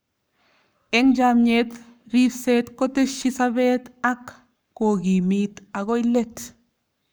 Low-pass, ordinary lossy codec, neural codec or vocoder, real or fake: none; none; codec, 44.1 kHz, 7.8 kbps, Pupu-Codec; fake